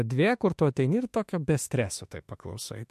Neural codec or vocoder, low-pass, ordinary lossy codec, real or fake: autoencoder, 48 kHz, 32 numbers a frame, DAC-VAE, trained on Japanese speech; 14.4 kHz; MP3, 64 kbps; fake